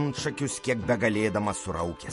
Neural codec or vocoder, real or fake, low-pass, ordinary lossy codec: vocoder, 48 kHz, 128 mel bands, Vocos; fake; 14.4 kHz; MP3, 48 kbps